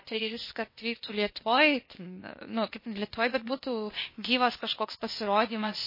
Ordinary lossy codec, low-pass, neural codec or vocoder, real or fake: MP3, 24 kbps; 5.4 kHz; codec, 16 kHz, 0.8 kbps, ZipCodec; fake